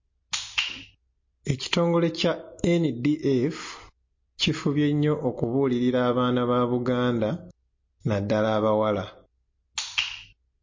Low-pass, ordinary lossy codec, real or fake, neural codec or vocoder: 7.2 kHz; MP3, 32 kbps; real; none